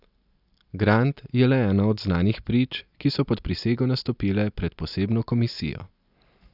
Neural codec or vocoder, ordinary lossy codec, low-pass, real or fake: none; none; 5.4 kHz; real